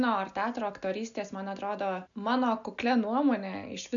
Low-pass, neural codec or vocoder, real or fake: 7.2 kHz; none; real